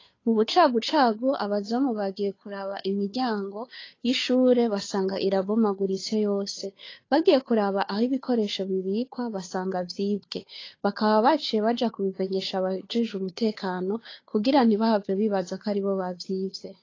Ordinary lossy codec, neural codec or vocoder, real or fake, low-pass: AAC, 32 kbps; codec, 16 kHz, 2 kbps, FunCodec, trained on Chinese and English, 25 frames a second; fake; 7.2 kHz